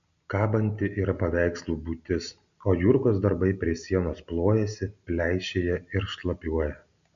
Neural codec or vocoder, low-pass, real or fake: none; 7.2 kHz; real